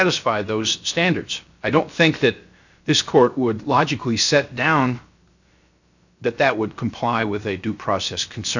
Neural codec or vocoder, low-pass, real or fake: codec, 16 kHz, 0.7 kbps, FocalCodec; 7.2 kHz; fake